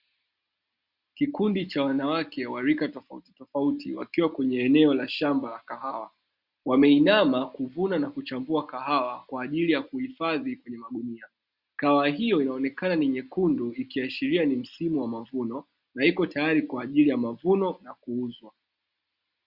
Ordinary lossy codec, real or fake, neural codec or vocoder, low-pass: Opus, 64 kbps; real; none; 5.4 kHz